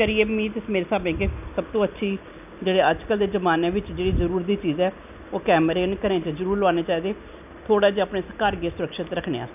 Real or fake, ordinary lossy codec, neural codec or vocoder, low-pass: real; none; none; 3.6 kHz